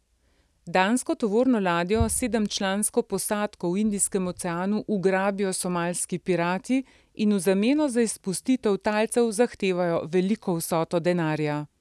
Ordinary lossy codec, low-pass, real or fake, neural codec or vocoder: none; none; real; none